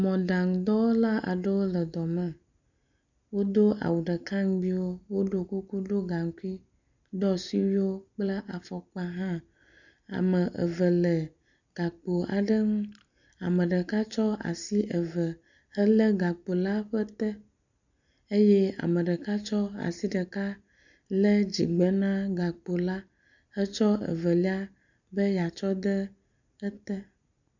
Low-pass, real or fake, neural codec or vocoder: 7.2 kHz; real; none